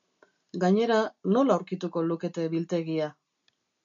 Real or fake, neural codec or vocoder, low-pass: real; none; 7.2 kHz